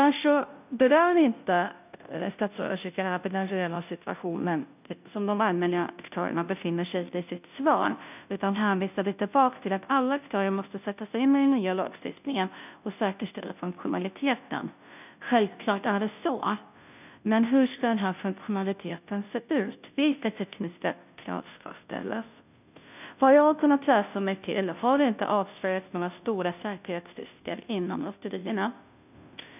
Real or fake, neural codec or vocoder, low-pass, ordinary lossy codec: fake; codec, 16 kHz, 0.5 kbps, FunCodec, trained on Chinese and English, 25 frames a second; 3.6 kHz; none